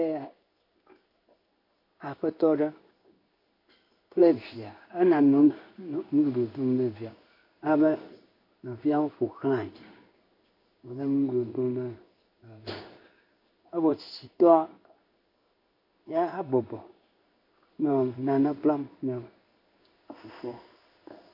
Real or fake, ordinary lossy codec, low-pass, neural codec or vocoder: fake; MP3, 32 kbps; 5.4 kHz; codec, 16 kHz in and 24 kHz out, 1 kbps, XY-Tokenizer